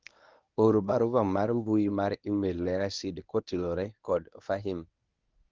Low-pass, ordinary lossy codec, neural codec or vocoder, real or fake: 7.2 kHz; Opus, 32 kbps; codec, 24 kHz, 0.9 kbps, WavTokenizer, medium speech release version 1; fake